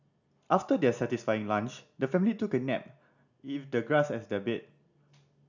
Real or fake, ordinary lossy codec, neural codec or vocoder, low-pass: real; none; none; 7.2 kHz